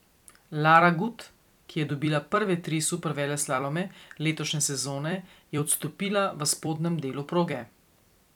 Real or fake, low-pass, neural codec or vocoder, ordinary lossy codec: fake; 19.8 kHz; vocoder, 44.1 kHz, 128 mel bands every 256 samples, BigVGAN v2; none